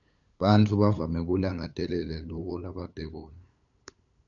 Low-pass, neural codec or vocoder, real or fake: 7.2 kHz; codec, 16 kHz, 8 kbps, FunCodec, trained on LibriTTS, 25 frames a second; fake